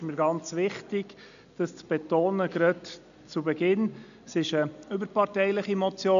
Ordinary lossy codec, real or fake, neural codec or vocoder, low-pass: none; real; none; 7.2 kHz